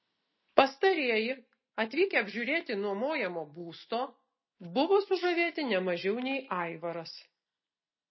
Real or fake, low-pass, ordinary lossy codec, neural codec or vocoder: real; 7.2 kHz; MP3, 24 kbps; none